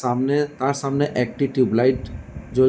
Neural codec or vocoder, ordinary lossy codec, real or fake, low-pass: none; none; real; none